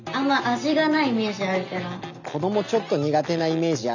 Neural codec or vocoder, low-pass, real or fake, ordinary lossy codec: none; 7.2 kHz; real; none